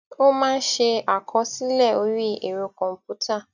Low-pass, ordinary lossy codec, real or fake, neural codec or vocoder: 7.2 kHz; none; real; none